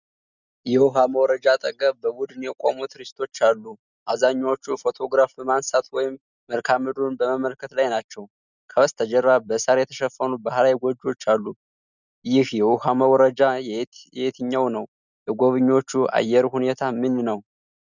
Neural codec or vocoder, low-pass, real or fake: none; 7.2 kHz; real